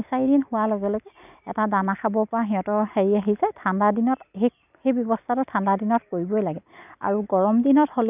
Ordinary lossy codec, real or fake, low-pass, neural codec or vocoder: none; real; 3.6 kHz; none